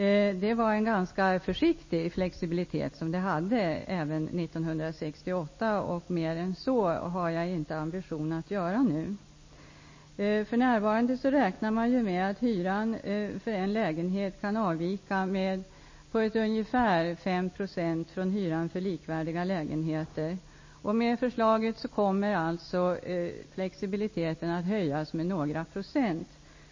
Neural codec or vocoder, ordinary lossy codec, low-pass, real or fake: none; MP3, 32 kbps; 7.2 kHz; real